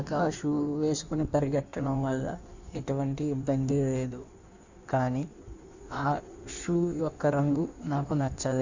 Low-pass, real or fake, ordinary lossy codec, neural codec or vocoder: 7.2 kHz; fake; Opus, 64 kbps; codec, 16 kHz in and 24 kHz out, 1.1 kbps, FireRedTTS-2 codec